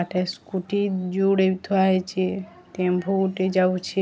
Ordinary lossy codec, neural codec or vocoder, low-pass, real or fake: none; none; none; real